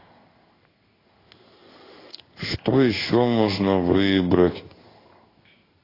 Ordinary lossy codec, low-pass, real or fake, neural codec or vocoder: AAC, 24 kbps; 5.4 kHz; fake; codec, 16 kHz in and 24 kHz out, 1 kbps, XY-Tokenizer